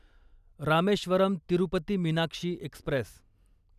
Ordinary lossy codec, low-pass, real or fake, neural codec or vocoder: none; 14.4 kHz; real; none